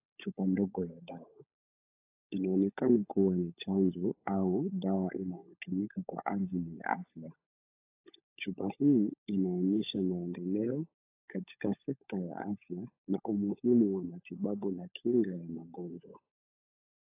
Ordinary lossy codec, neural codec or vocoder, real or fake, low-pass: AAC, 32 kbps; codec, 16 kHz, 16 kbps, FunCodec, trained on LibriTTS, 50 frames a second; fake; 3.6 kHz